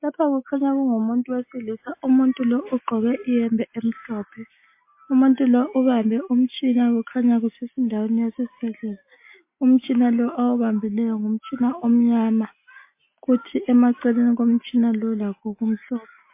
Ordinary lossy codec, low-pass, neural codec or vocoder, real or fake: MP3, 24 kbps; 3.6 kHz; none; real